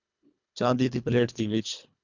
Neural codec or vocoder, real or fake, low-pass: codec, 24 kHz, 1.5 kbps, HILCodec; fake; 7.2 kHz